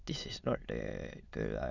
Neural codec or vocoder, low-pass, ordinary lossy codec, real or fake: autoencoder, 22.05 kHz, a latent of 192 numbers a frame, VITS, trained on many speakers; 7.2 kHz; none; fake